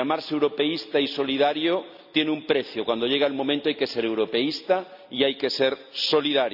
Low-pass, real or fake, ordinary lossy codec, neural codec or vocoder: 5.4 kHz; real; none; none